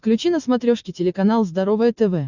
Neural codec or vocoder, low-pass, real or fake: none; 7.2 kHz; real